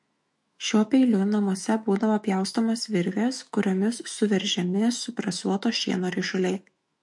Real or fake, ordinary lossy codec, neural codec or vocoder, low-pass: fake; MP3, 48 kbps; autoencoder, 48 kHz, 128 numbers a frame, DAC-VAE, trained on Japanese speech; 10.8 kHz